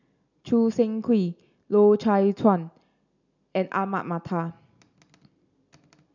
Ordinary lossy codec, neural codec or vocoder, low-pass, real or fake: none; none; 7.2 kHz; real